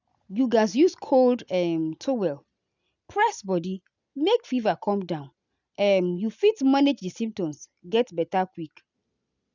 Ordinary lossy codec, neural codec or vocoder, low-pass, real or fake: none; none; 7.2 kHz; real